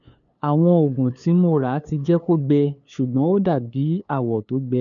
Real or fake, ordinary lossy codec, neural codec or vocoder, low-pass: fake; none; codec, 16 kHz, 2 kbps, FunCodec, trained on LibriTTS, 25 frames a second; 7.2 kHz